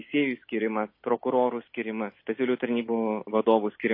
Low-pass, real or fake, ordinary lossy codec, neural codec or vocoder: 5.4 kHz; real; MP3, 24 kbps; none